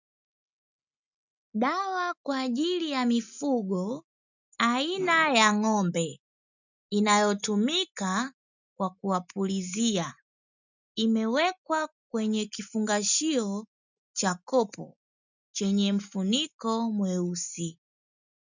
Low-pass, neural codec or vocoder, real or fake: 7.2 kHz; none; real